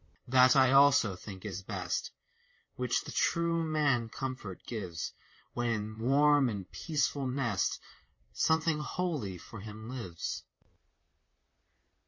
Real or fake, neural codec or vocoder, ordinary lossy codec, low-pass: fake; vocoder, 44.1 kHz, 128 mel bands, Pupu-Vocoder; MP3, 32 kbps; 7.2 kHz